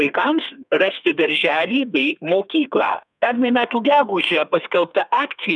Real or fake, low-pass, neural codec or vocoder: fake; 10.8 kHz; codec, 32 kHz, 1.9 kbps, SNAC